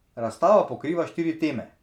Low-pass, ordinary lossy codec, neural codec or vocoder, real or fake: 19.8 kHz; none; none; real